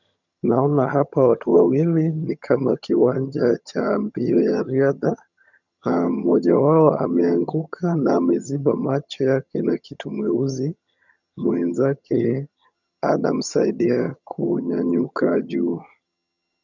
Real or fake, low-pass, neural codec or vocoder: fake; 7.2 kHz; vocoder, 22.05 kHz, 80 mel bands, HiFi-GAN